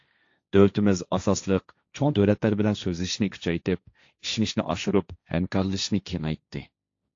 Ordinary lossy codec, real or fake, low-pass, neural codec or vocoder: AAC, 48 kbps; fake; 7.2 kHz; codec, 16 kHz, 1.1 kbps, Voila-Tokenizer